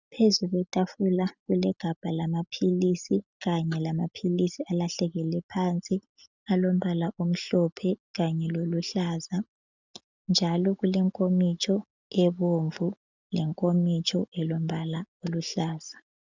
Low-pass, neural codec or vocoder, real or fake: 7.2 kHz; none; real